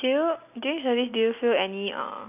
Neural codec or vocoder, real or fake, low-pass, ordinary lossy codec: none; real; 3.6 kHz; none